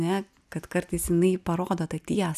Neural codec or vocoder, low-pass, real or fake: none; 14.4 kHz; real